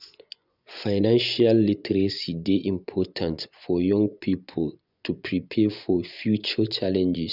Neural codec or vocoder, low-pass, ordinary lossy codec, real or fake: vocoder, 24 kHz, 100 mel bands, Vocos; 5.4 kHz; none; fake